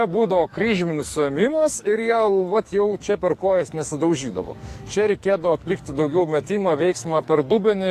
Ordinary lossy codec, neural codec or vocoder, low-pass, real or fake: AAC, 64 kbps; codec, 44.1 kHz, 2.6 kbps, SNAC; 14.4 kHz; fake